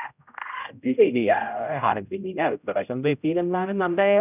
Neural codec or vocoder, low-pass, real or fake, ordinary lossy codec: codec, 16 kHz, 0.5 kbps, X-Codec, HuBERT features, trained on general audio; 3.6 kHz; fake; none